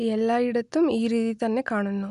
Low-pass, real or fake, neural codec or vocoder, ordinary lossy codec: 10.8 kHz; real; none; none